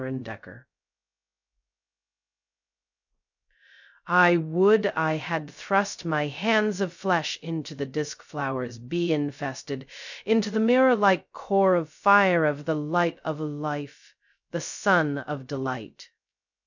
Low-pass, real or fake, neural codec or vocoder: 7.2 kHz; fake; codec, 16 kHz, 0.2 kbps, FocalCodec